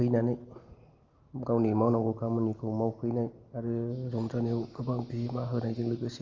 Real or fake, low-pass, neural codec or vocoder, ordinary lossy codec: real; 7.2 kHz; none; Opus, 32 kbps